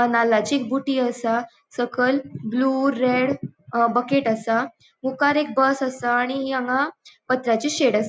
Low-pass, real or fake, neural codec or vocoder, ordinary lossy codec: none; real; none; none